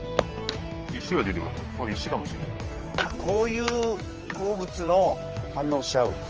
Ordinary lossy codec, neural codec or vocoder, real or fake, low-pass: Opus, 24 kbps; codec, 16 kHz, 4 kbps, X-Codec, HuBERT features, trained on general audio; fake; 7.2 kHz